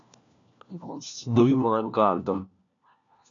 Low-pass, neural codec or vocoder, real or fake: 7.2 kHz; codec, 16 kHz, 1 kbps, FunCodec, trained on LibriTTS, 50 frames a second; fake